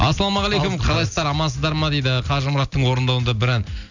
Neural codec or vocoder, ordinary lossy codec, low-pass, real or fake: none; none; 7.2 kHz; real